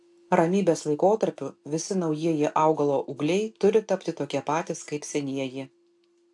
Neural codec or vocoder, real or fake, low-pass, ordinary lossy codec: none; real; 10.8 kHz; AAC, 64 kbps